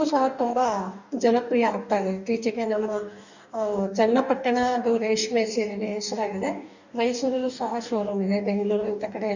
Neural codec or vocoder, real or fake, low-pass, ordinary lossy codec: codec, 44.1 kHz, 2.6 kbps, DAC; fake; 7.2 kHz; none